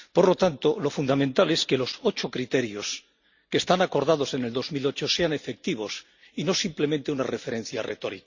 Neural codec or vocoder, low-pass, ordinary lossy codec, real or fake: none; 7.2 kHz; Opus, 64 kbps; real